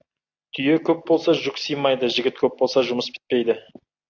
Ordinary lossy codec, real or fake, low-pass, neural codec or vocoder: AAC, 48 kbps; real; 7.2 kHz; none